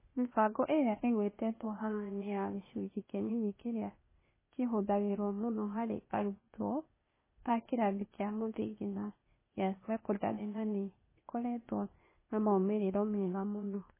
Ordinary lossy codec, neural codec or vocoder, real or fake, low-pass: MP3, 16 kbps; codec, 16 kHz, 0.8 kbps, ZipCodec; fake; 3.6 kHz